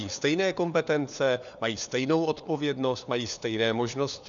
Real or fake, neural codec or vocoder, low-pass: fake; codec, 16 kHz, 4 kbps, FunCodec, trained on LibriTTS, 50 frames a second; 7.2 kHz